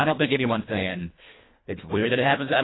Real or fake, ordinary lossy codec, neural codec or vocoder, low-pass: fake; AAC, 16 kbps; codec, 24 kHz, 1.5 kbps, HILCodec; 7.2 kHz